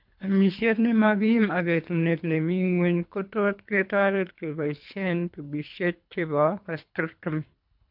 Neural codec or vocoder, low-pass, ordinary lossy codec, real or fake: codec, 24 kHz, 3 kbps, HILCodec; 5.4 kHz; MP3, 48 kbps; fake